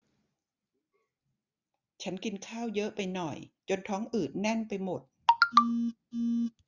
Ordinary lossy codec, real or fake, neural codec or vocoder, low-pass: Opus, 64 kbps; real; none; 7.2 kHz